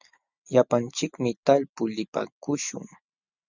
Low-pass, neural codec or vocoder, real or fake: 7.2 kHz; none; real